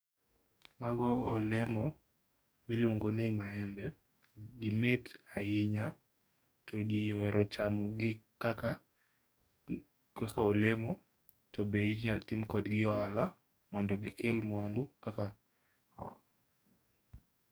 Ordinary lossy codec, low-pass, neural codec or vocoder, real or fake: none; none; codec, 44.1 kHz, 2.6 kbps, DAC; fake